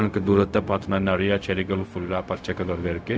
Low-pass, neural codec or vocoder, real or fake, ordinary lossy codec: none; codec, 16 kHz, 0.4 kbps, LongCat-Audio-Codec; fake; none